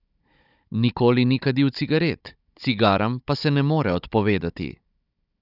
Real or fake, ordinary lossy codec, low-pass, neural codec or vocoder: fake; none; 5.4 kHz; codec, 16 kHz, 16 kbps, FunCodec, trained on Chinese and English, 50 frames a second